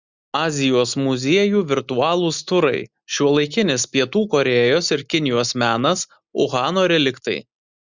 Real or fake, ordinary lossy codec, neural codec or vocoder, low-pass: real; Opus, 64 kbps; none; 7.2 kHz